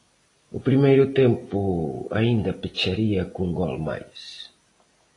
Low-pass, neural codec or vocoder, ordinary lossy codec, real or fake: 10.8 kHz; none; AAC, 32 kbps; real